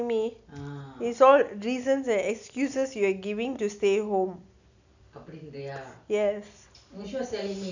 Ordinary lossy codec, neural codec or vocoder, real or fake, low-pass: none; none; real; 7.2 kHz